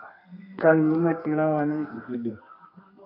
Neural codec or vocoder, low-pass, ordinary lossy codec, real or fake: codec, 32 kHz, 1.9 kbps, SNAC; 5.4 kHz; AAC, 32 kbps; fake